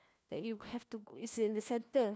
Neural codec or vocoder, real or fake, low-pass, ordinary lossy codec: codec, 16 kHz, 1 kbps, FunCodec, trained on LibriTTS, 50 frames a second; fake; none; none